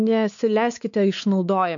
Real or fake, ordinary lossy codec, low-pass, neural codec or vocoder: fake; MP3, 96 kbps; 7.2 kHz; codec, 16 kHz, 4 kbps, X-Codec, WavLM features, trained on Multilingual LibriSpeech